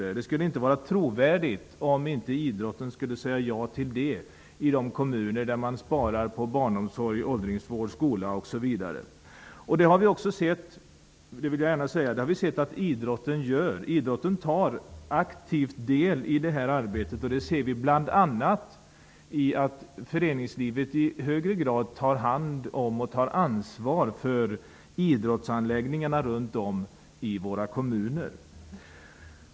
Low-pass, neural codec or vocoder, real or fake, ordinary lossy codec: none; none; real; none